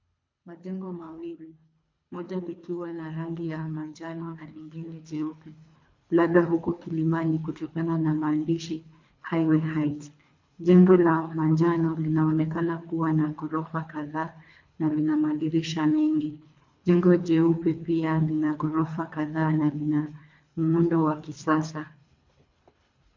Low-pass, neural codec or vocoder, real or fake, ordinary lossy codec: 7.2 kHz; codec, 24 kHz, 3 kbps, HILCodec; fake; MP3, 48 kbps